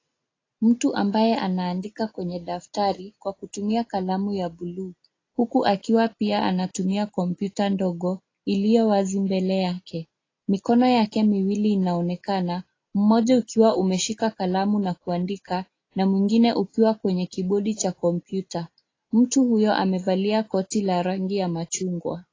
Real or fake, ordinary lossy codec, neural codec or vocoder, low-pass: real; AAC, 32 kbps; none; 7.2 kHz